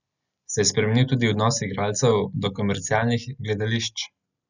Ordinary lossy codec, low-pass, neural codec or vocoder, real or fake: none; 7.2 kHz; none; real